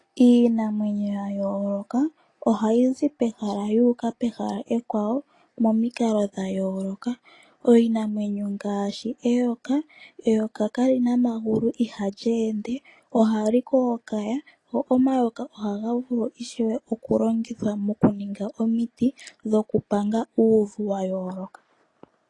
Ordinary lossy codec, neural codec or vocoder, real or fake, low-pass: AAC, 32 kbps; none; real; 10.8 kHz